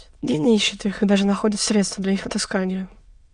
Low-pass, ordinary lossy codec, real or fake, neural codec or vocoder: 9.9 kHz; Opus, 64 kbps; fake; autoencoder, 22.05 kHz, a latent of 192 numbers a frame, VITS, trained on many speakers